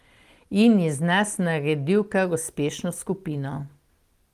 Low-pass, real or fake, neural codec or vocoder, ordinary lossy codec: 14.4 kHz; real; none; Opus, 24 kbps